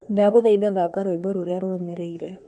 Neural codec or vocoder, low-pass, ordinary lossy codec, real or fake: codec, 24 kHz, 1 kbps, SNAC; 10.8 kHz; none; fake